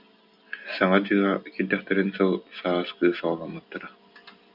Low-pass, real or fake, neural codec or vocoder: 5.4 kHz; real; none